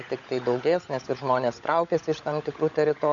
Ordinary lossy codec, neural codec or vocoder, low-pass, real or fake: MP3, 96 kbps; codec, 16 kHz, 16 kbps, FunCodec, trained on LibriTTS, 50 frames a second; 7.2 kHz; fake